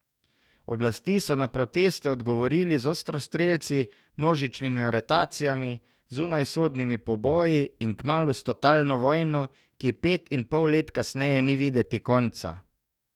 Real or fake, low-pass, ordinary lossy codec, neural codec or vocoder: fake; 19.8 kHz; none; codec, 44.1 kHz, 2.6 kbps, DAC